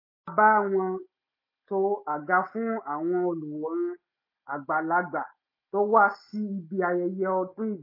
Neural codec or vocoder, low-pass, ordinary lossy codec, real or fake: none; 5.4 kHz; MP3, 24 kbps; real